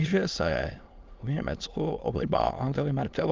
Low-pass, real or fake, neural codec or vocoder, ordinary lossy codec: 7.2 kHz; fake; autoencoder, 22.05 kHz, a latent of 192 numbers a frame, VITS, trained on many speakers; Opus, 24 kbps